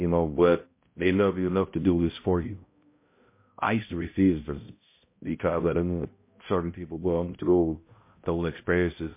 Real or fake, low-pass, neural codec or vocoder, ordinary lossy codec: fake; 3.6 kHz; codec, 16 kHz, 0.5 kbps, X-Codec, HuBERT features, trained on balanced general audio; MP3, 24 kbps